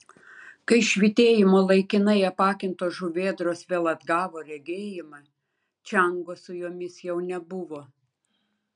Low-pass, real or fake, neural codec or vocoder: 9.9 kHz; real; none